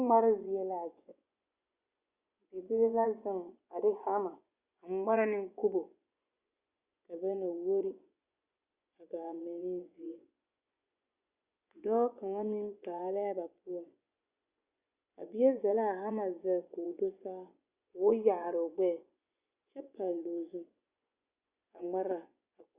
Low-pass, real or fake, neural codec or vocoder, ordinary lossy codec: 3.6 kHz; fake; vocoder, 24 kHz, 100 mel bands, Vocos; Opus, 64 kbps